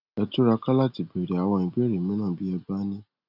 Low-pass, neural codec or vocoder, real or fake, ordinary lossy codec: 5.4 kHz; none; real; none